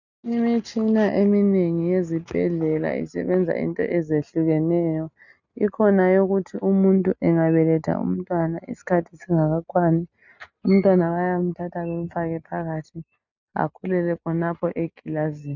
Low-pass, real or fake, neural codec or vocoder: 7.2 kHz; real; none